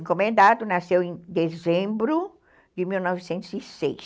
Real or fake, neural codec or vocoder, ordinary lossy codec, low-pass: real; none; none; none